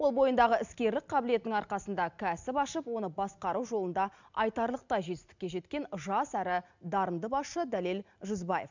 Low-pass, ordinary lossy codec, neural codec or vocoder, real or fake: 7.2 kHz; none; none; real